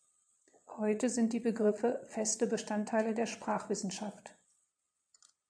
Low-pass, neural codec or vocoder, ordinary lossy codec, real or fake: 9.9 kHz; vocoder, 22.05 kHz, 80 mel bands, Vocos; MP3, 64 kbps; fake